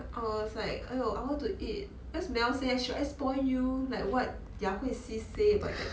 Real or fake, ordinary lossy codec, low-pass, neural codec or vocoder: real; none; none; none